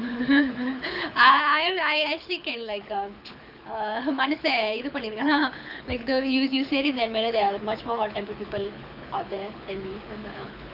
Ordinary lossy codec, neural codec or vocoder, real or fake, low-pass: none; codec, 24 kHz, 6 kbps, HILCodec; fake; 5.4 kHz